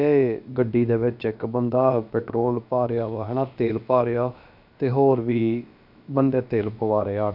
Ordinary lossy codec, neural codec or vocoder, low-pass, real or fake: none; codec, 16 kHz, about 1 kbps, DyCAST, with the encoder's durations; 5.4 kHz; fake